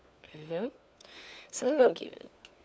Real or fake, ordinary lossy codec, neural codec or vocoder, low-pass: fake; none; codec, 16 kHz, 4 kbps, FunCodec, trained on LibriTTS, 50 frames a second; none